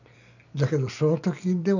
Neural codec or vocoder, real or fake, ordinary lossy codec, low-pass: none; real; none; 7.2 kHz